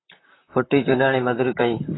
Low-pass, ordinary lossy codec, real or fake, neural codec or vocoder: 7.2 kHz; AAC, 16 kbps; fake; vocoder, 44.1 kHz, 128 mel bands, Pupu-Vocoder